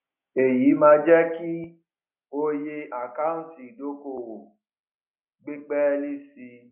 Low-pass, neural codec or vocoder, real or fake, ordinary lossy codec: 3.6 kHz; none; real; none